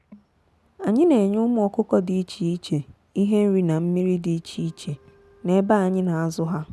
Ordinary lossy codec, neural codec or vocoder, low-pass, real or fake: none; none; none; real